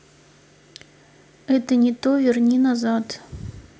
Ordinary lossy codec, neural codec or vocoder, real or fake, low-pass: none; none; real; none